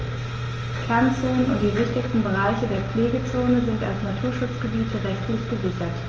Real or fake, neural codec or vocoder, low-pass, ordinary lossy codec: real; none; 7.2 kHz; Opus, 24 kbps